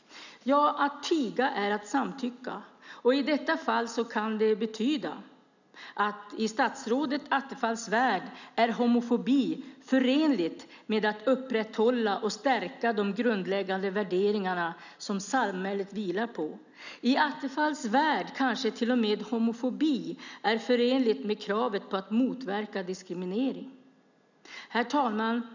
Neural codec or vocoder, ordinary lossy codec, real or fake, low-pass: none; none; real; 7.2 kHz